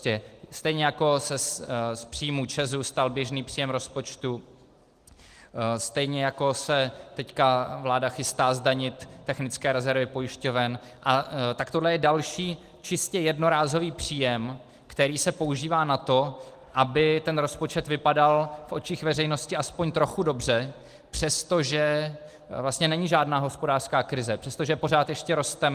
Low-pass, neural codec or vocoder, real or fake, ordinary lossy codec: 14.4 kHz; none; real; Opus, 24 kbps